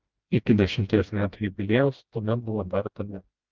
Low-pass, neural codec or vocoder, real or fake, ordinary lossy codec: 7.2 kHz; codec, 16 kHz, 1 kbps, FreqCodec, smaller model; fake; Opus, 24 kbps